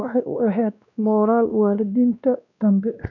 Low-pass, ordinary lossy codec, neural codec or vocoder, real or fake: 7.2 kHz; none; codec, 16 kHz, 1 kbps, X-Codec, WavLM features, trained on Multilingual LibriSpeech; fake